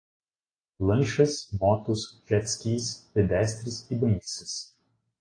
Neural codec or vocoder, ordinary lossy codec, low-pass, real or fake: none; AAC, 32 kbps; 9.9 kHz; real